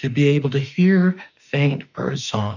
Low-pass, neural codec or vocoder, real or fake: 7.2 kHz; autoencoder, 48 kHz, 32 numbers a frame, DAC-VAE, trained on Japanese speech; fake